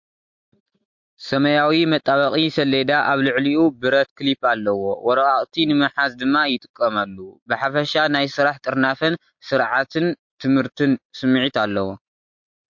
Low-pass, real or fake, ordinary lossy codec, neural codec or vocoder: 7.2 kHz; real; MP3, 48 kbps; none